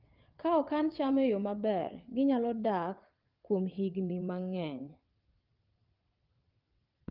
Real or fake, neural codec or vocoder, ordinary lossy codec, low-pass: fake; vocoder, 22.05 kHz, 80 mel bands, WaveNeXt; Opus, 32 kbps; 5.4 kHz